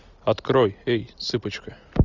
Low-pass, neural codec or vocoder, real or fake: 7.2 kHz; none; real